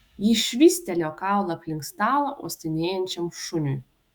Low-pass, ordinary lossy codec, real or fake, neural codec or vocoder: 19.8 kHz; Opus, 64 kbps; fake; autoencoder, 48 kHz, 128 numbers a frame, DAC-VAE, trained on Japanese speech